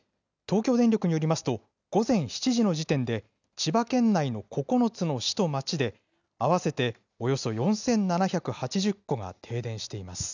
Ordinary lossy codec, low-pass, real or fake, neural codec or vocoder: none; 7.2 kHz; real; none